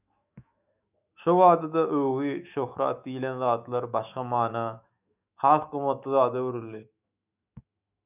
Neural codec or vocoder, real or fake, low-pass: autoencoder, 48 kHz, 128 numbers a frame, DAC-VAE, trained on Japanese speech; fake; 3.6 kHz